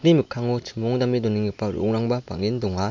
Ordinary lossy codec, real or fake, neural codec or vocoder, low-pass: MP3, 48 kbps; real; none; 7.2 kHz